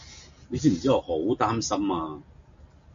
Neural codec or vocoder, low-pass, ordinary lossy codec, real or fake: none; 7.2 kHz; MP3, 64 kbps; real